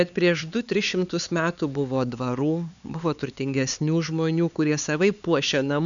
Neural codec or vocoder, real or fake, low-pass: codec, 16 kHz, 4 kbps, X-Codec, HuBERT features, trained on LibriSpeech; fake; 7.2 kHz